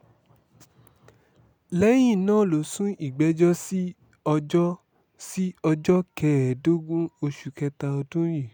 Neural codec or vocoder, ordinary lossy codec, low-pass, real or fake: none; none; none; real